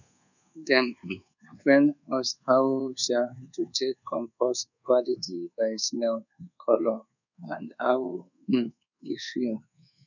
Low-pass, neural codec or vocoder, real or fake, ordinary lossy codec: 7.2 kHz; codec, 24 kHz, 1.2 kbps, DualCodec; fake; none